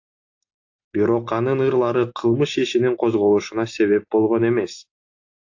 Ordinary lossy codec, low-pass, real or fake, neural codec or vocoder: AAC, 48 kbps; 7.2 kHz; real; none